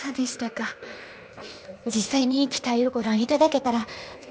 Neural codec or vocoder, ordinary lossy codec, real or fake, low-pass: codec, 16 kHz, 0.8 kbps, ZipCodec; none; fake; none